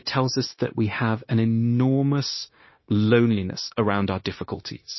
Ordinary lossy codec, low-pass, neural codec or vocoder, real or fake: MP3, 24 kbps; 7.2 kHz; codec, 16 kHz, 0.9 kbps, LongCat-Audio-Codec; fake